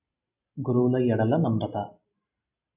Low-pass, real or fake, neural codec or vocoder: 3.6 kHz; real; none